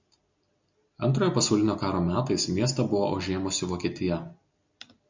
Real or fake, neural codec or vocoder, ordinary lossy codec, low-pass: real; none; MP3, 64 kbps; 7.2 kHz